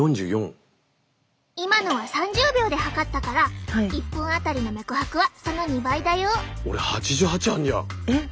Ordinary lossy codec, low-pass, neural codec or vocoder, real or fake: none; none; none; real